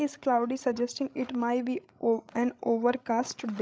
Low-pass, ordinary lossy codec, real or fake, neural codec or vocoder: none; none; fake; codec, 16 kHz, 16 kbps, FreqCodec, larger model